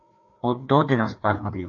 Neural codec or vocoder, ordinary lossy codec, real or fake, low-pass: codec, 16 kHz, 2 kbps, FreqCodec, larger model; AAC, 64 kbps; fake; 7.2 kHz